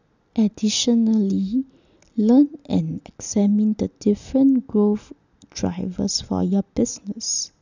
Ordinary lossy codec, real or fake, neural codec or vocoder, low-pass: none; real; none; 7.2 kHz